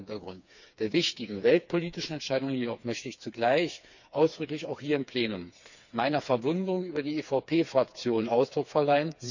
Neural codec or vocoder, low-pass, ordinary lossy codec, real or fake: codec, 16 kHz, 4 kbps, FreqCodec, smaller model; 7.2 kHz; none; fake